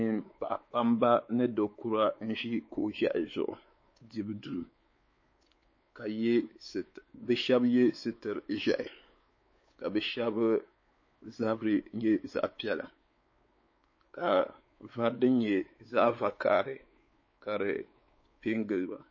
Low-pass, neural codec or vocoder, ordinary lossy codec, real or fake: 7.2 kHz; codec, 16 kHz, 4 kbps, X-Codec, WavLM features, trained on Multilingual LibriSpeech; MP3, 32 kbps; fake